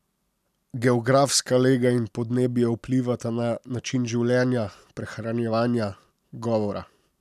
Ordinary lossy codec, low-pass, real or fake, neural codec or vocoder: none; 14.4 kHz; real; none